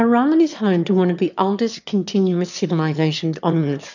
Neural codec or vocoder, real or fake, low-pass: autoencoder, 22.05 kHz, a latent of 192 numbers a frame, VITS, trained on one speaker; fake; 7.2 kHz